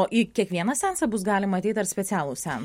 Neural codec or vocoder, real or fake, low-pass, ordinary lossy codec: none; real; 19.8 kHz; MP3, 64 kbps